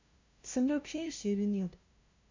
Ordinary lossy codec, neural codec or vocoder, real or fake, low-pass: MP3, 64 kbps; codec, 16 kHz, 0.5 kbps, FunCodec, trained on LibriTTS, 25 frames a second; fake; 7.2 kHz